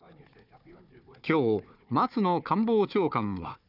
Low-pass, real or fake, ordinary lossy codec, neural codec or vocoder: 5.4 kHz; fake; none; codec, 16 kHz, 4 kbps, FunCodec, trained on Chinese and English, 50 frames a second